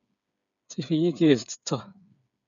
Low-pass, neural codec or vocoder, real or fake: 7.2 kHz; codec, 16 kHz, 8 kbps, FreqCodec, smaller model; fake